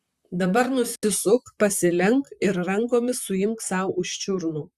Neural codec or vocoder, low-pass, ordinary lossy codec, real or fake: vocoder, 44.1 kHz, 128 mel bands, Pupu-Vocoder; 14.4 kHz; Opus, 64 kbps; fake